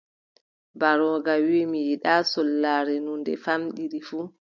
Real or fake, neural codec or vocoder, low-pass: real; none; 7.2 kHz